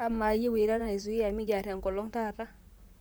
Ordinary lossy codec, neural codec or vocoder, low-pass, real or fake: none; vocoder, 44.1 kHz, 128 mel bands, Pupu-Vocoder; none; fake